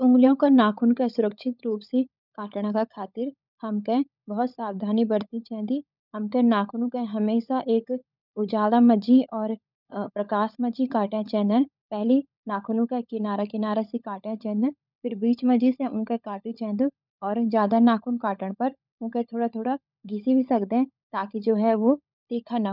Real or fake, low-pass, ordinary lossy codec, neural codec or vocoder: fake; 5.4 kHz; none; codec, 16 kHz, 16 kbps, FunCodec, trained on LibriTTS, 50 frames a second